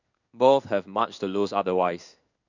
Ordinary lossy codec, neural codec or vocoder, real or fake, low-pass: none; codec, 16 kHz in and 24 kHz out, 1 kbps, XY-Tokenizer; fake; 7.2 kHz